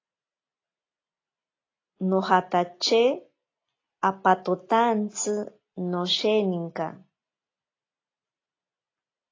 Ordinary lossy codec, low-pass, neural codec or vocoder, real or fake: AAC, 32 kbps; 7.2 kHz; none; real